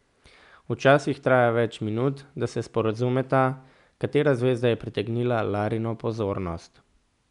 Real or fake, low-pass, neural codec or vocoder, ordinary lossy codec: real; 10.8 kHz; none; none